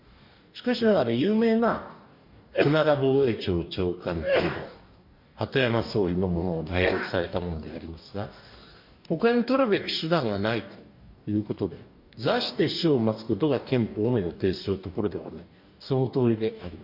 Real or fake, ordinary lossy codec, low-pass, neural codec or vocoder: fake; MP3, 48 kbps; 5.4 kHz; codec, 44.1 kHz, 2.6 kbps, DAC